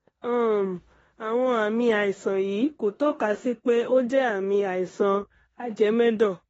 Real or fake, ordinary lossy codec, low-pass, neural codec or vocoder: fake; AAC, 24 kbps; 10.8 kHz; codec, 16 kHz in and 24 kHz out, 0.9 kbps, LongCat-Audio-Codec, four codebook decoder